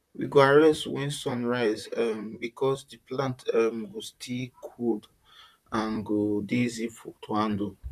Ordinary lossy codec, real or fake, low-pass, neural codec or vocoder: none; fake; 14.4 kHz; vocoder, 44.1 kHz, 128 mel bands, Pupu-Vocoder